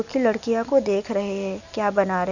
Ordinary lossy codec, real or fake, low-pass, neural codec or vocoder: none; real; 7.2 kHz; none